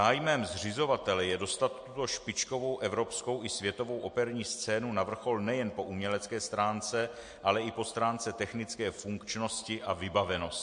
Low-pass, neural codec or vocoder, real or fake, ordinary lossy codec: 9.9 kHz; none; real; MP3, 48 kbps